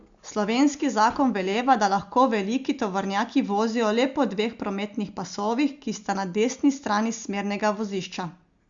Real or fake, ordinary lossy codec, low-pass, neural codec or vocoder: real; Opus, 64 kbps; 7.2 kHz; none